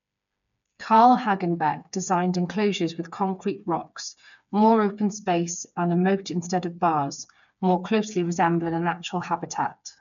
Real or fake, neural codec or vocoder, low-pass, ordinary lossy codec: fake; codec, 16 kHz, 4 kbps, FreqCodec, smaller model; 7.2 kHz; none